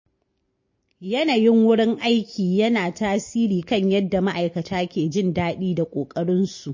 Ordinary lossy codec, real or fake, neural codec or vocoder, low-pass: MP3, 32 kbps; real; none; 7.2 kHz